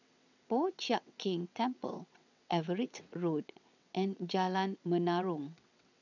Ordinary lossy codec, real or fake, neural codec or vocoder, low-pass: none; fake; vocoder, 22.05 kHz, 80 mel bands, WaveNeXt; 7.2 kHz